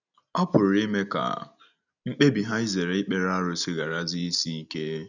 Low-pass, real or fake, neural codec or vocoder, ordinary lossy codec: 7.2 kHz; real; none; none